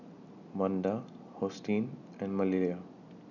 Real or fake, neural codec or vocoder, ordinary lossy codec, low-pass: real; none; none; 7.2 kHz